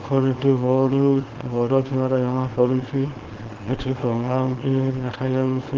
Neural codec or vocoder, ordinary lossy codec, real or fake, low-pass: codec, 24 kHz, 0.9 kbps, WavTokenizer, small release; Opus, 16 kbps; fake; 7.2 kHz